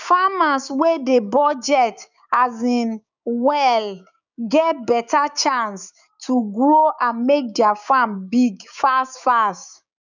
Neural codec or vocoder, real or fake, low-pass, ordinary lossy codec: codec, 16 kHz, 6 kbps, DAC; fake; 7.2 kHz; none